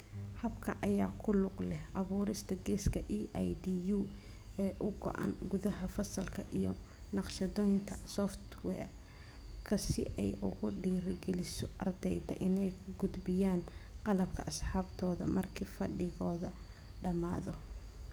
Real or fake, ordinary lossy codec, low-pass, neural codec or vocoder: fake; none; none; codec, 44.1 kHz, 7.8 kbps, DAC